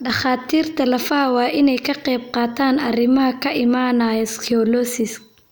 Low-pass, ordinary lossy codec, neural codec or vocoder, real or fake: none; none; none; real